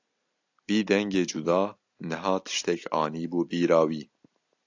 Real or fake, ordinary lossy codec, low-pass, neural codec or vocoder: real; AAC, 48 kbps; 7.2 kHz; none